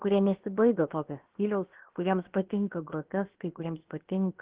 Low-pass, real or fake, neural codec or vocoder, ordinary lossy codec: 3.6 kHz; fake; codec, 16 kHz, about 1 kbps, DyCAST, with the encoder's durations; Opus, 16 kbps